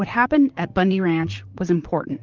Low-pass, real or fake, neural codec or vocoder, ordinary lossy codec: 7.2 kHz; fake; vocoder, 44.1 kHz, 128 mel bands, Pupu-Vocoder; Opus, 32 kbps